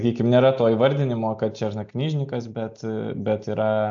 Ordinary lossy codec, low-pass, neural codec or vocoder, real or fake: AAC, 64 kbps; 7.2 kHz; none; real